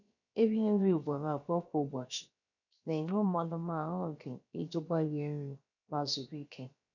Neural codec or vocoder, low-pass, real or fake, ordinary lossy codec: codec, 16 kHz, about 1 kbps, DyCAST, with the encoder's durations; 7.2 kHz; fake; none